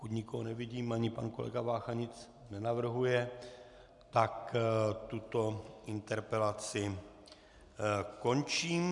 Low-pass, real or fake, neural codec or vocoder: 10.8 kHz; real; none